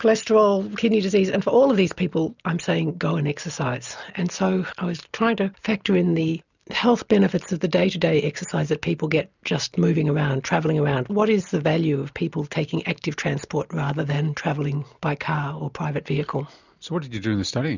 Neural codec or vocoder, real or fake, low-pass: none; real; 7.2 kHz